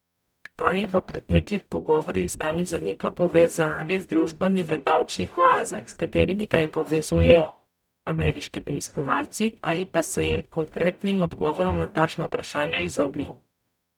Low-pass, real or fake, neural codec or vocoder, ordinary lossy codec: 19.8 kHz; fake; codec, 44.1 kHz, 0.9 kbps, DAC; none